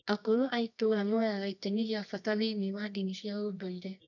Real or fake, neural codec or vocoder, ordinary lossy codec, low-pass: fake; codec, 24 kHz, 0.9 kbps, WavTokenizer, medium music audio release; none; 7.2 kHz